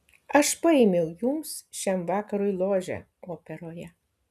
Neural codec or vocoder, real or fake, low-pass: none; real; 14.4 kHz